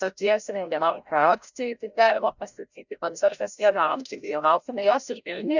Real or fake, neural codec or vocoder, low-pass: fake; codec, 16 kHz, 0.5 kbps, FreqCodec, larger model; 7.2 kHz